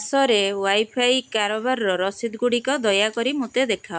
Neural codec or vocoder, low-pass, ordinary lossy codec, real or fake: none; none; none; real